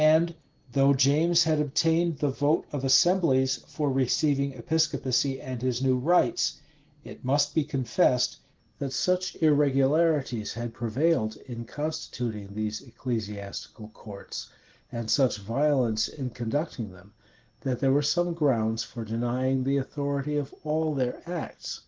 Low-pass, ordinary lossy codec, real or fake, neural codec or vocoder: 7.2 kHz; Opus, 16 kbps; real; none